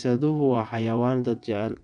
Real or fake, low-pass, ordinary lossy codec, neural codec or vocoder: fake; 9.9 kHz; none; vocoder, 22.05 kHz, 80 mel bands, WaveNeXt